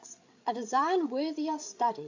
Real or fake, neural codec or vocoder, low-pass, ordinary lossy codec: fake; codec, 16 kHz, 8 kbps, FreqCodec, larger model; 7.2 kHz; AAC, 48 kbps